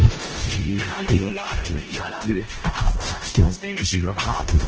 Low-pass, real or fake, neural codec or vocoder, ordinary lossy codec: 7.2 kHz; fake; codec, 16 kHz in and 24 kHz out, 0.4 kbps, LongCat-Audio-Codec, four codebook decoder; Opus, 16 kbps